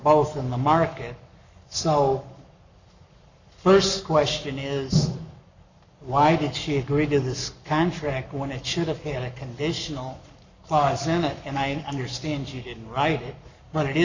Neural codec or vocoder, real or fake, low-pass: codec, 44.1 kHz, 7.8 kbps, Pupu-Codec; fake; 7.2 kHz